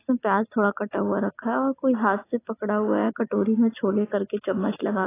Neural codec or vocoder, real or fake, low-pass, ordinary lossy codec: none; real; 3.6 kHz; AAC, 16 kbps